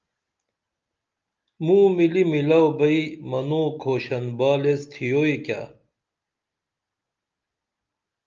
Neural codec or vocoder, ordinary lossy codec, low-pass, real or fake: none; Opus, 24 kbps; 7.2 kHz; real